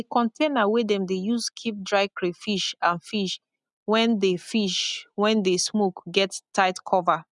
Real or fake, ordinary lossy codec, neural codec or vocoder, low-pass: real; none; none; 10.8 kHz